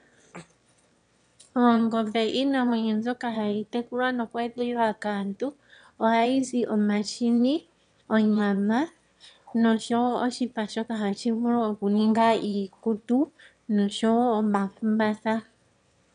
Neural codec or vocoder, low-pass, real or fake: autoencoder, 22.05 kHz, a latent of 192 numbers a frame, VITS, trained on one speaker; 9.9 kHz; fake